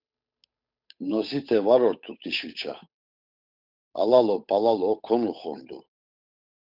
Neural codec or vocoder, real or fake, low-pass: codec, 16 kHz, 8 kbps, FunCodec, trained on Chinese and English, 25 frames a second; fake; 5.4 kHz